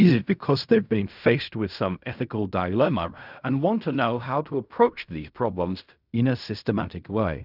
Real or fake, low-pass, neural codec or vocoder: fake; 5.4 kHz; codec, 16 kHz in and 24 kHz out, 0.4 kbps, LongCat-Audio-Codec, fine tuned four codebook decoder